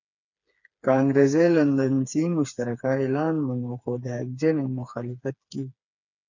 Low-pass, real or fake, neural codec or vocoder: 7.2 kHz; fake; codec, 16 kHz, 4 kbps, FreqCodec, smaller model